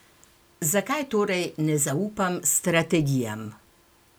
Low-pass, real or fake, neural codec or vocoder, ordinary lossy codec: none; real; none; none